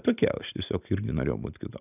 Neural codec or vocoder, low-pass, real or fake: none; 3.6 kHz; real